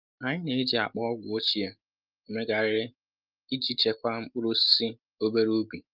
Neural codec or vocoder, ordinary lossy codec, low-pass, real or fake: none; Opus, 32 kbps; 5.4 kHz; real